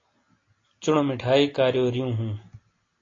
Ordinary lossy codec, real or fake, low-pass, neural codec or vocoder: AAC, 32 kbps; real; 7.2 kHz; none